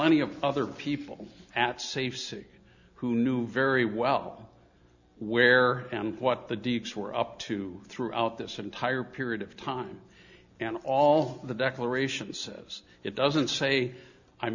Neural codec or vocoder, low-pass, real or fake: none; 7.2 kHz; real